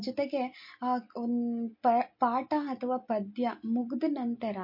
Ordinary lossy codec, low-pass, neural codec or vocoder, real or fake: MP3, 32 kbps; 5.4 kHz; none; real